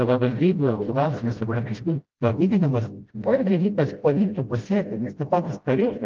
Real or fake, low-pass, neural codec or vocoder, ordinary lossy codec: fake; 7.2 kHz; codec, 16 kHz, 0.5 kbps, FreqCodec, smaller model; Opus, 24 kbps